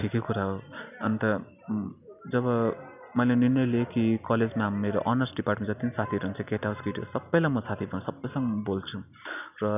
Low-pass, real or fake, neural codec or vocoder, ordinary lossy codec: 3.6 kHz; real; none; none